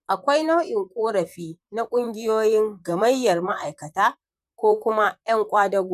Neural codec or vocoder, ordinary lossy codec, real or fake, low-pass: vocoder, 44.1 kHz, 128 mel bands, Pupu-Vocoder; none; fake; 14.4 kHz